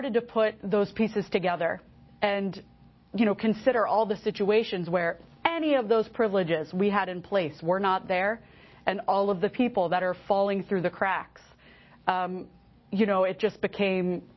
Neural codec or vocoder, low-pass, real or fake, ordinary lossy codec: none; 7.2 kHz; real; MP3, 24 kbps